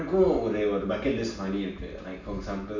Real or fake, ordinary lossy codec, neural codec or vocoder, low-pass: real; none; none; 7.2 kHz